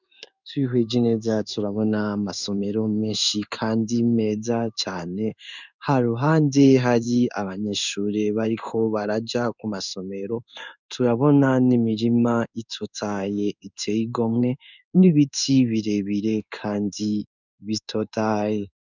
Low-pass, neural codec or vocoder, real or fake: 7.2 kHz; codec, 16 kHz in and 24 kHz out, 1 kbps, XY-Tokenizer; fake